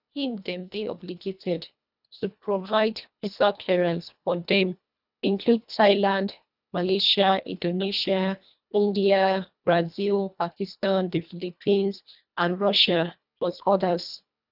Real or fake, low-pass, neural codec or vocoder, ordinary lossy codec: fake; 5.4 kHz; codec, 24 kHz, 1.5 kbps, HILCodec; none